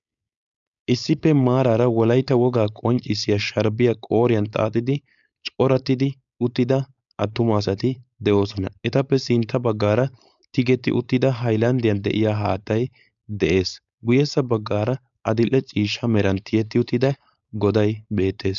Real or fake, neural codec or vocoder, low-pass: fake; codec, 16 kHz, 4.8 kbps, FACodec; 7.2 kHz